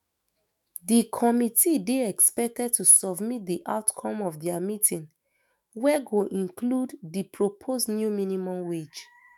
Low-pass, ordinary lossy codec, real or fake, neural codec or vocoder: 19.8 kHz; none; fake; autoencoder, 48 kHz, 128 numbers a frame, DAC-VAE, trained on Japanese speech